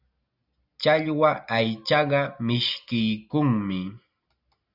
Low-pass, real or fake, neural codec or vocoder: 5.4 kHz; real; none